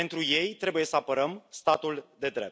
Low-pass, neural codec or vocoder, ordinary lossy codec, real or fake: none; none; none; real